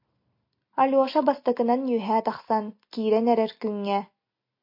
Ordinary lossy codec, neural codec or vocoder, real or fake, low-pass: MP3, 32 kbps; none; real; 5.4 kHz